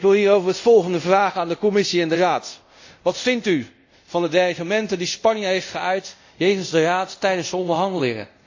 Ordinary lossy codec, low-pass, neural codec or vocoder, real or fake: AAC, 48 kbps; 7.2 kHz; codec, 24 kHz, 0.5 kbps, DualCodec; fake